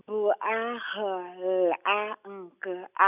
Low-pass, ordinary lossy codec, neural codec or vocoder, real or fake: 3.6 kHz; none; none; real